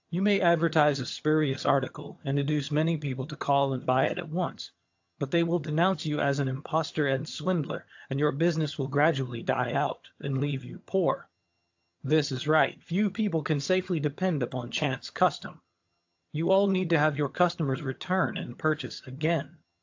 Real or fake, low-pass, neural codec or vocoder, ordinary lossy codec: fake; 7.2 kHz; vocoder, 22.05 kHz, 80 mel bands, HiFi-GAN; AAC, 48 kbps